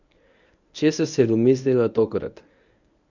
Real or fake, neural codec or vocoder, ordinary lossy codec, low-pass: fake; codec, 24 kHz, 0.9 kbps, WavTokenizer, medium speech release version 1; none; 7.2 kHz